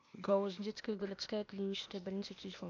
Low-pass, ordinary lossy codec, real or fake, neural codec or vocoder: 7.2 kHz; AAC, 48 kbps; fake; codec, 16 kHz, 0.8 kbps, ZipCodec